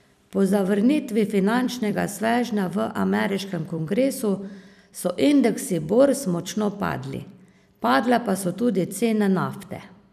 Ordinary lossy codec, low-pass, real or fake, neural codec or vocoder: none; 14.4 kHz; fake; vocoder, 44.1 kHz, 128 mel bands every 256 samples, BigVGAN v2